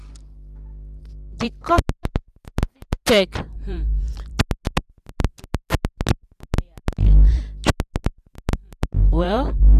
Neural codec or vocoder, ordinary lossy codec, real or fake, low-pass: vocoder, 44.1 kHz, 128 mel bands every 512 samples, BigVGAN v2; none; fake; 14.4 kHz